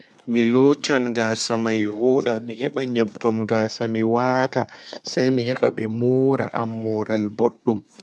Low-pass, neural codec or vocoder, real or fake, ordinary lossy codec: none; codec, 24 kHz, 1 kbps, SNAC; fake; none